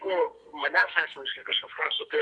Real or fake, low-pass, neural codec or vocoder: fake; 9.9 kHz; codec, 32 kHz, 1.9 kbps, SNAC